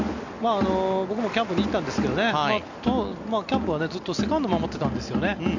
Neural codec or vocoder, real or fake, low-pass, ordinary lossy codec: none; real; 7.2 kHz; none